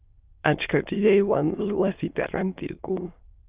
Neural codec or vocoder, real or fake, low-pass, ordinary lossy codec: autoencoder, 22.05 kHz, a latent of 192 numbers a frame, VITS, trained on many speakers; fake; 3.6 kHz; Opus, 24 kbps